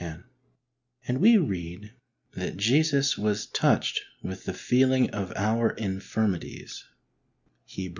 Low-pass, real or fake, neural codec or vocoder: 7.2 kHz; real; none